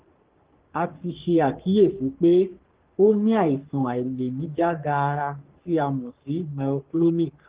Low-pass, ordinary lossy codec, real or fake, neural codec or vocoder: 3.6 kHz; Opus, 16 kbps; fake; codec, 44.1 kHz, 3.4 kbps, Pupu-Codec